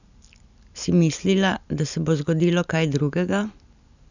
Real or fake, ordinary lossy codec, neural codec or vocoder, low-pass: real; none; none; 7.2 kHz